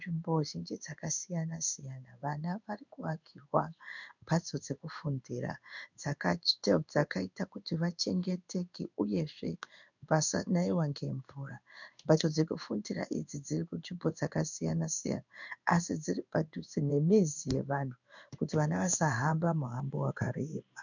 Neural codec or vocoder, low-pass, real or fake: codec, 16 kHz in and 24 kHz out, 1 kbps, XY-Tokenizer; 7.2 kHz; fake